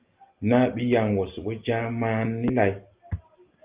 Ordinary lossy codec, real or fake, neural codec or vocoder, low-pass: Opus, 24 kbps; real; none; 3.6 kHz